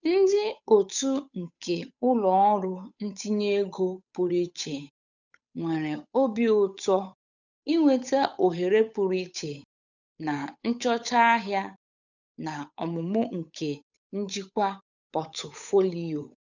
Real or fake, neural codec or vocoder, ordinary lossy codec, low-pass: fake; codec, 16 kHz, 8 kbps, FunCodec, trained on Chinese and English, 25 frames a second; none; 7.2 kHz